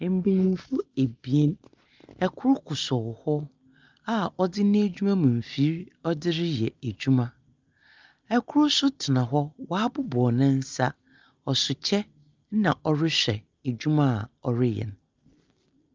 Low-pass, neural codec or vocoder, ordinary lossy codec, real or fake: 7.2 kHz; none; Opus, 24 kbps; real